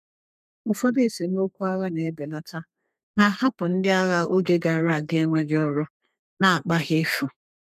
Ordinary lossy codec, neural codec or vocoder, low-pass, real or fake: none; codec, 32 kHz, 1.9 kbps, SNAC; 14.4 kHz; fake